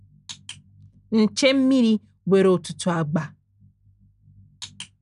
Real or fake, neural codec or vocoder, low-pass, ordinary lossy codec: real; none; 10.8 kHz; none